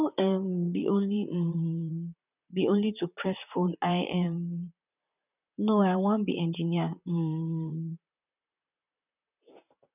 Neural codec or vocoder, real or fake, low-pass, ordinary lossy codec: vocoder, 44.1 kHz, 128 mel bands, Pupu-Vocoder; fake; 3.6 kHz; none